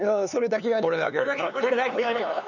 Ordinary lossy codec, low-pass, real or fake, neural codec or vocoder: none; 7.2 kHz; fake; codec, 16 kHz, 4 kbps, X-Codec, HuBERT features, trained on LibriSpeech